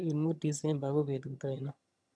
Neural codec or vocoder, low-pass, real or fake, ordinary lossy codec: vocoder, 22.05 kHz, 80 mel bands, HiFi-GAN; none; fake; none